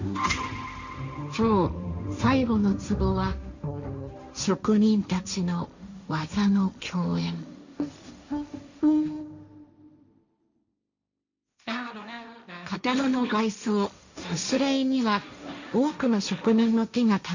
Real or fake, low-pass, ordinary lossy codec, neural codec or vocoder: fake; 7.2 kHz; none; codec, 16 kHz, 1.1 kbps, Voila-Tokenizer